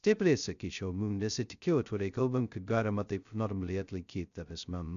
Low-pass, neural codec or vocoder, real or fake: 7.2 kHz; codec, 16 kHz, 0.2 kbps, FocalCodec; fake